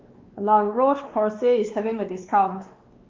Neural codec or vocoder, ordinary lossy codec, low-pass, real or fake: codec, 16 kHz, 2 kbps, X-Codec, WavLM features, trained on Multilingual LibriSpeech; Opus, 24 kbps; 7.2 kHz; fake